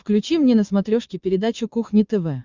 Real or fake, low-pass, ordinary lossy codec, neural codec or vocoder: fake; 7.2 kHz; Opus, 64 kbps; vocoder, 22.05 kHz, 80 mel bands, Vocos